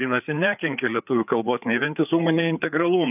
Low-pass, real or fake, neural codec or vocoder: 3.6 kHz; fake; codec, 16 kHz, 4 kbps, FreqCodec, larger model